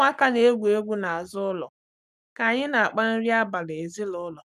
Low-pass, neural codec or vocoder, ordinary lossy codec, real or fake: 14.4 kHz; codec, 44.1 kHz, 7.8 kbps, Pupu-Codec; none; fake